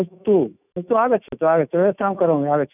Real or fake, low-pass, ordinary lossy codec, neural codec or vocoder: fake; 3.6 kHz; none; autoencoder, 48 kHz, 128 numbers a frame, DAC-VAE, trained on Japanese speech